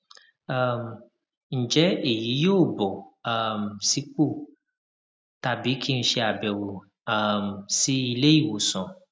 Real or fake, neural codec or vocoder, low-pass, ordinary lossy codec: real; none; none; none